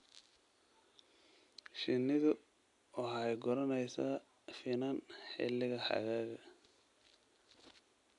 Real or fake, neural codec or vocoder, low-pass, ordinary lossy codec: real; none; 10.8 kHz; none